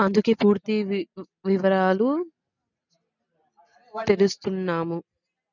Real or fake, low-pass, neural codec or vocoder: real; 7.2 kHz; none